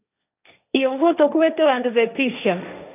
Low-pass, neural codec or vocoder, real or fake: 3.6 kHz; codec, 16 kHz, 1.1 kbps, Voila-Tokenizer; fake